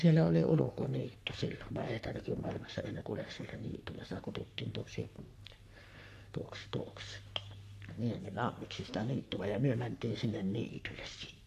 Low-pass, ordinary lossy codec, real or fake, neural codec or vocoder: 14.4 kHz; AAC, 64 kbps; fake; codec, 44.1 kHz, 3.4 kbps, Pupu-Codec